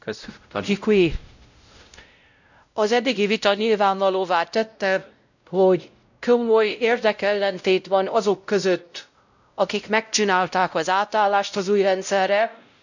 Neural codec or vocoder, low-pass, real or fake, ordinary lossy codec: codec, 16 kHz, 0.5 kbps, X-Codec, WavLM features, trained on Multilingual LibriSpeech; 7.2 kHz; fake; none